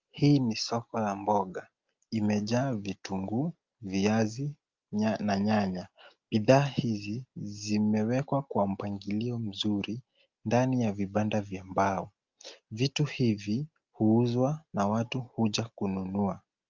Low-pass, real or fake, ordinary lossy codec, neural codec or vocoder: 7.2 kHz; real; Opus, 24 kbps; none